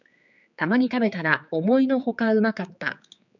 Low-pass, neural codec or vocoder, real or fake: 7.2 kHz; codec, 16 kHz, 4 kbps, X-Codec, HuBERT features, trained on general audio; fake